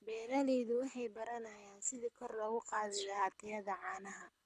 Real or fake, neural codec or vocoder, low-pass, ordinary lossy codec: fake; codec, 24 kHz, 6 kbps, HILCodec; none; none